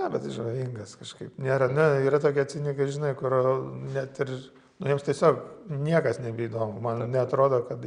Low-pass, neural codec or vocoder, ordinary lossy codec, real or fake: 9.9 kHz; none; Opus, 64 kbps; real